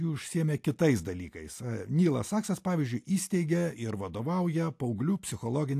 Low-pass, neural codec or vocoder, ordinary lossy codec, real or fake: 14.4 kHz; none; AAC, 64 kbps; real